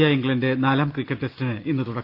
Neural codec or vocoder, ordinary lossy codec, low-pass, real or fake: none; Opus, 32 kbps; 5.4 kHz; real